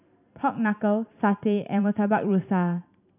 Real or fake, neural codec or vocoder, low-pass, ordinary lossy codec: fake; vocoder, 44.1 kHz, 128 mel bands every 512 samples, BigVGAN v2; 3.6 kHz; none